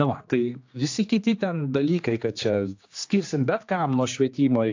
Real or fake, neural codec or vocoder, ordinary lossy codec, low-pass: fake; codec, 16 kHz, 2 kbps, X-Codec, HuBERT features, trained on general audio; AAC, 48 kbps; 7.2 kHz